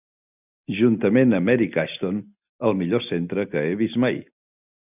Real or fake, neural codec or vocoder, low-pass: real; none; 3.6 kHz